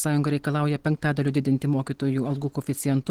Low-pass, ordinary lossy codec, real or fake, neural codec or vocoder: 19.8 kHz; Opus, 16 kbps; real; none